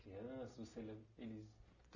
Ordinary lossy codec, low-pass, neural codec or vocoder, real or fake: none; 7.2 kHz; none; real